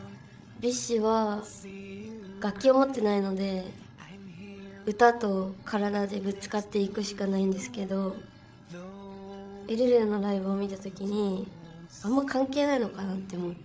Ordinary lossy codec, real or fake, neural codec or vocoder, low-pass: none; fake; codec, 16 kHz, 16 kbps, FreqCodec, larger model; none